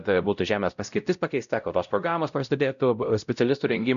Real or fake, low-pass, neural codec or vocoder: fake; 7.2 kHz; codec, 16 kHz, 0.5 kbps, X-Codec, WavLM features, trained on Multilingual LibriSpeech